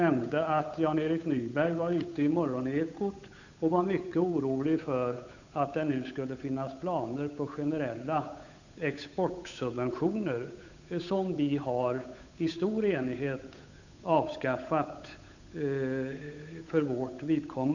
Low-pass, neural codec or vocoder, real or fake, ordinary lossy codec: 7.2 kHz; codec, 16 kHz, 8 kbps, FunCodec, trained on Chinese and English, 25 frames a second; fake; none